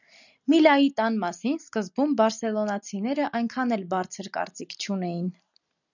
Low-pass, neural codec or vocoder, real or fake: 7.2 kHz; none; real